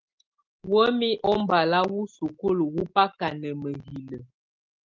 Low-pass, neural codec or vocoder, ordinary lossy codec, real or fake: 7.2 kHz; none; Opus, 24 kbps; real